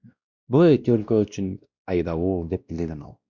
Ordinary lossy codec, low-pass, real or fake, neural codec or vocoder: Opus, 64 kbps; 7.2 kHz; fake; codec, 16 kHz, 1 kbps, X-Codec, WavLM features, trained on Multilingual LibriSpeech